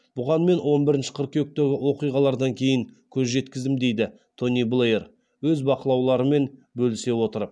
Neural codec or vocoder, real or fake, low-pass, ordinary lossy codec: none; real; none; none